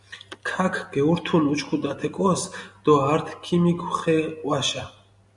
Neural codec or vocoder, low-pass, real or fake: none; 10.8 kHz; real